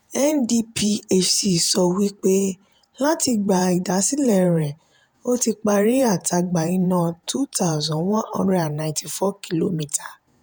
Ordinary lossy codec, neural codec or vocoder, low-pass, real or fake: none; vocoder, 48 kHz, 128 mel bands, Vocos; none; fake